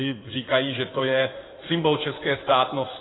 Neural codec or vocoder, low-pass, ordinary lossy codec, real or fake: codec, 16 kHz in and 24 kHz out, 2.2 kbps, FireRedTTS-2 codec; 7.2 kHz; AAC, 16 kbps; fake